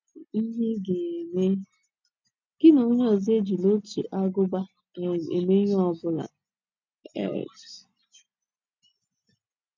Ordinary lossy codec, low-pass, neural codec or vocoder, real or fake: MP3, 64 kbps; 7.2 kHz; none; real